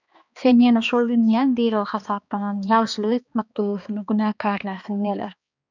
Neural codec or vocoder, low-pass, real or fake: codec, 16 kHz, 2 kbps, X-Codec, HuBERT features, trained on balanced general audio; 7.2 kHz; fake